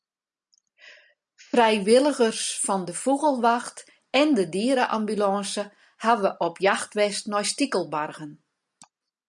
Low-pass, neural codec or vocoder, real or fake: 10.8 kHz; none; real